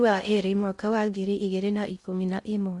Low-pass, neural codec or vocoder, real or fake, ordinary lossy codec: 10.8 kHz; codec, 16 kHz in and 24 kHz out, 0.6 kbps, FocalCodec, streaming, 2048 codes; fake; AAC, 64 kbps